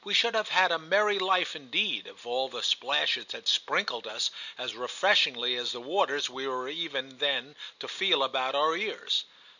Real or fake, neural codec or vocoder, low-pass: real; none; 7.2 kHz